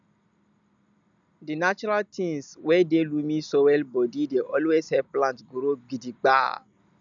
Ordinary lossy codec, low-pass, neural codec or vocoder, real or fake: none; 7.2 kHz; none; real